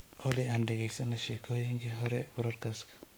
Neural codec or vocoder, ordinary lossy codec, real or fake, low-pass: codec, 44.1 kHz, 7.8 kbps, Pupu-Codec; none; fake; none